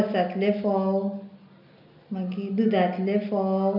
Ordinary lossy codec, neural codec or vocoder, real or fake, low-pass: none; none; real; 5.4 kHz